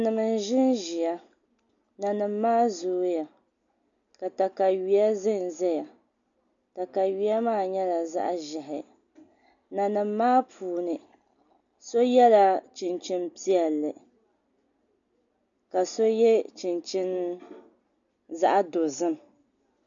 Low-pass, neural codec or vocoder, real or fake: 7.2 kHz; none; real